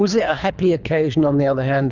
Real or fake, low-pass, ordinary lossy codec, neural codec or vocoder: fake; 7.2 kHz; Opus, 64 kbps; codec, 24 kHz, 3 kbps, HILCodec